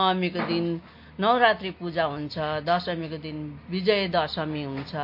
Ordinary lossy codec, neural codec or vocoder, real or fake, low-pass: MP3, 32 kbps; none; real; 5.4 kHz